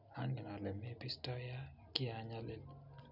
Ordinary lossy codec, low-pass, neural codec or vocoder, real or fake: none; 5.4 kHz; none; real